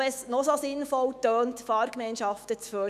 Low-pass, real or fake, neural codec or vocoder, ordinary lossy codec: 14.4 kHz; fake; autoencoder, 48 kHz, 128 numbers a frame, DAC-VAE, trained on Japanese speech; none